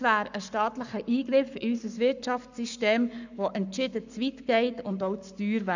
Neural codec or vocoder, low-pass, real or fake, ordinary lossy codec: codec, 44.1 kHz, 7.8 kbps, Pupu-Codec; 7.2 kHz; fake; none